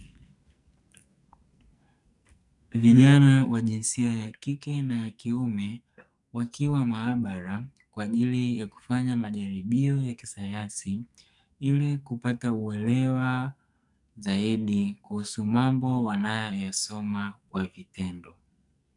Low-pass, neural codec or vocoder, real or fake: 10.8 kHz; codec, 44.1 kHz, 2.6 kbps, SNAC; fake